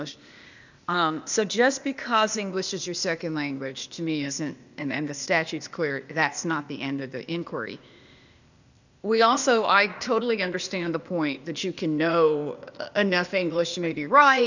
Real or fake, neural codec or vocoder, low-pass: fake; codec, 16 kHz, 0.8 kbps, ZipCodec; 7.2 kHz